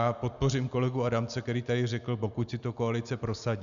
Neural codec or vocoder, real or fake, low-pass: none; real; 7.2 kHz